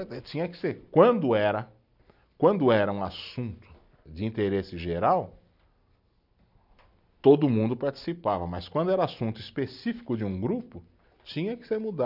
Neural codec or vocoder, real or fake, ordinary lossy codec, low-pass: none; real; none; 5.4 kHz